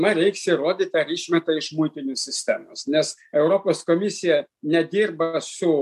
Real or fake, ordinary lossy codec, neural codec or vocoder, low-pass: real; MP3, 96 kbps; none; 14.4 kHz